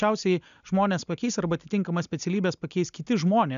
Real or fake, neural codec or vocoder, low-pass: real; none; 7.2 kHz